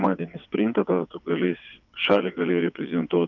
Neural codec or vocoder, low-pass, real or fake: vocoder, 22.05 kHz, 80 mel bands, WaveNeXt; 7.2 kHz; fake